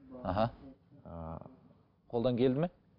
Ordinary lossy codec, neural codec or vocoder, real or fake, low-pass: MP3, 48 kbps; none; real; 5.4 kHz